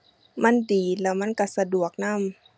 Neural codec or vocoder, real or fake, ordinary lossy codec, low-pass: none; real; none; none